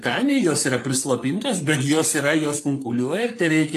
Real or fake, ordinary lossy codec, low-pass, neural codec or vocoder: fake; AAC, 64 kbps; 14.4 kHz; codec, 44.1 kHz, 3.4 kbps, Pupu-Codec